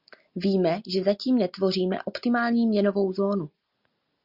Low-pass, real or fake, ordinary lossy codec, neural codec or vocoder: 5.4 kHz; real; Opus, 64 kbps; none